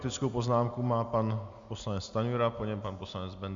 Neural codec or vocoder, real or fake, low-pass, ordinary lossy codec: none; real; 7.2 kHz; Opus, 64 kbps